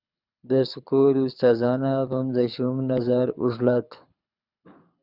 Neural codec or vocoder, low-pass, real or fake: codec, 24 kHz, 6 kbps, HILCodec; 5.4 kHz; fake